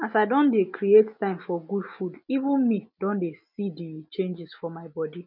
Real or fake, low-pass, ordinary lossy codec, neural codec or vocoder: real; 5.4 kHz; none; none